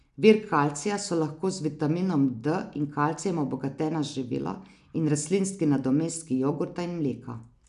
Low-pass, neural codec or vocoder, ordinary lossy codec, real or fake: 10.8 kHz; none; none; real